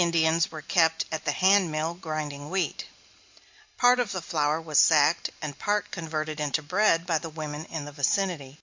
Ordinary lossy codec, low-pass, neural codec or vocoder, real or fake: MP3, 64 kbps; 7.2 kHz; none; real